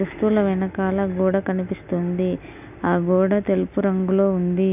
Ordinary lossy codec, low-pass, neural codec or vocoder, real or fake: none; 3.6 kHz; none; real